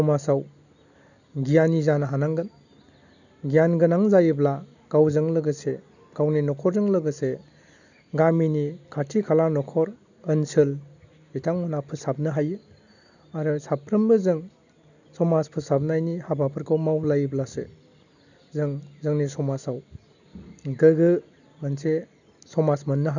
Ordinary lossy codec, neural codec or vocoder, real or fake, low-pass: none; none; real; 7.2 kHz